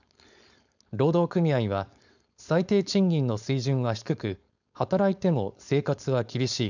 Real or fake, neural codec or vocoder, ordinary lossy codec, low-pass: fake; codec, 16 kHz, 4.8 kbps, FACodec; none; 7.2 kHz